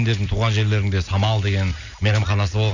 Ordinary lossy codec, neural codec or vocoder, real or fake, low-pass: none; none; real; 7.2 kHz